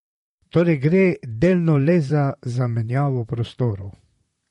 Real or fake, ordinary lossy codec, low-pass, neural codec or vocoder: fake; MP3, 48 kbps; 19.8 kHz; vocoder, 44.1 kHz, 128 mel bands, Pupu-Vocoder